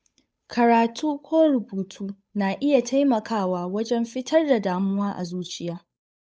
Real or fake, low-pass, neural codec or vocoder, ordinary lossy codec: fake; none; codec, 16 kHz, 8 kbps, FunCodec, trained on Chinese and English, 25 frames a second; none